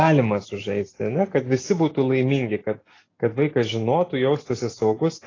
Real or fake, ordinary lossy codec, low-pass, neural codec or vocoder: real; AAC, 32 kbps; 7.2 kHz; none